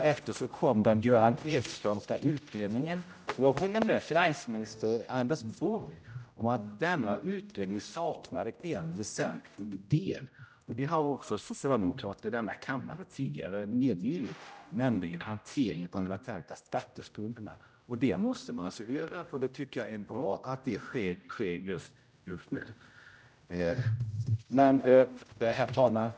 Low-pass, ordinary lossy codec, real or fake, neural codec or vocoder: none; none; fake; codec, 16 kHz, 0.5 kbps, X-Codec, HuBERT features, trained on general audio